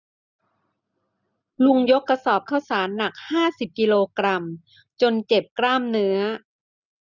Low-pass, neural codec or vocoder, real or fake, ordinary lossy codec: 7.2 kHz; none; real; none